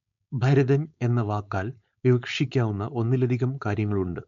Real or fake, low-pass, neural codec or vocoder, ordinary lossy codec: fake; 7.2 kHz; codec, 16 kHz, 4.8 kbps, FACodec; MP3, 64 kbps